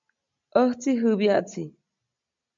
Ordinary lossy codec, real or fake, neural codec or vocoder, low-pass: MP3, 64 kbps; real; none; 7.2 kHz